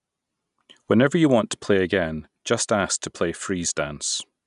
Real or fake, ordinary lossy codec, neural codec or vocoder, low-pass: real; none; none; 10.8 kHz